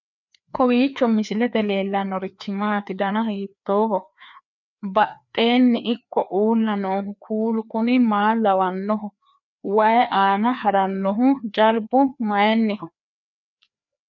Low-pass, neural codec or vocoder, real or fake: 7.2 kHz; codec, 16 kHz, 2 kbps, FreqCodec, larger model; fake